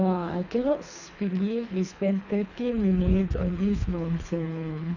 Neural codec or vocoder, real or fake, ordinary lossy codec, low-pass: codec, 24 kHz, 3 kbps, HILCodec; fake; none; 7.2 kHz